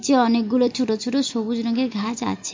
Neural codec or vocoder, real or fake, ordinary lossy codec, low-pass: none; real; MP3, 48 kbps; 7.2 kHz